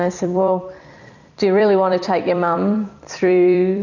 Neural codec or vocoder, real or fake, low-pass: vocoder, 44.1 kHz, 128 mel bands every 256 samples, BigVGAN v2; fake; 7.2 kHz